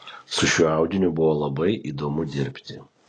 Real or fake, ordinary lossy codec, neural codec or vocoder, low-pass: real; AAC, 32 kbps; none; 9.9 kHz